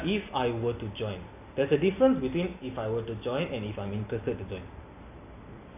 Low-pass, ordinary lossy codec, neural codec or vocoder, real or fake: 3.6 kHz; none; none; real